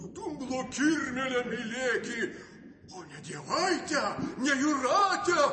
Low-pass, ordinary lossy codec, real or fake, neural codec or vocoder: 10.8 kHz; MP3, 32 kbps; real; none